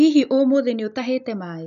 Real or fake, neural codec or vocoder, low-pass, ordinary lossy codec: real; none; 7.2 kHz; none